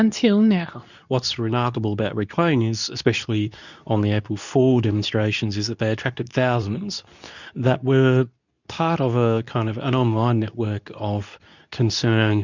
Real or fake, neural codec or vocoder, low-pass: fake; codec, 24 kHz, 0.9 kbps, WavTokenizer, medium speech release version 2; 7.2 kHz